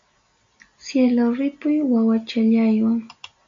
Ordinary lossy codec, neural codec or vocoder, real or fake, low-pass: MP3, 48 kbps; none; real; 7.2 kHz